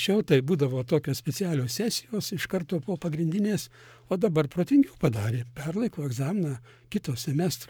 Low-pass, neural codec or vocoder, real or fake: 19.8 kHz; codec, 44.1 kHz, 7.8 kbps, Pupu-Codec; fake